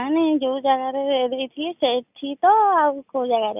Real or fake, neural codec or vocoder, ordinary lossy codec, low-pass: real; none; none; 3.6 kHz